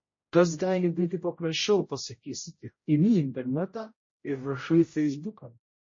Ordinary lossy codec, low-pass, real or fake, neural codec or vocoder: MP3, 32 kbps; 7.2 kHz; fake; codec, 16 kHz, 0.5 kbps, X-Codec, HuBERT features, trained on general audio